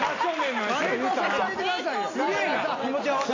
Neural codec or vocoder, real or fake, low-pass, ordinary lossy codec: none; real; 7.2 kHz; AAC, 32 kbps